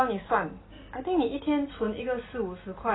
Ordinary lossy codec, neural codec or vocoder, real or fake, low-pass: AAC, 16 kbps; none; real; 7.2 kHz